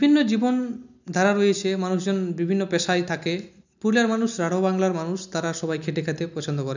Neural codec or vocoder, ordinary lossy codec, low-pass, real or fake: none; none; 7.2 kHz; real